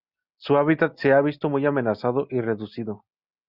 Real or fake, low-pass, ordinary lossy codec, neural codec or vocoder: real; 5.4 kHz; Opus, 64 kbps; none